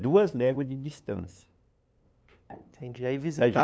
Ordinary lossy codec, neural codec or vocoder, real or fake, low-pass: none; codec, 16 kHz, 2 kbps, FunCodec, trained on LibriTTS, 25 frames a second; fake; none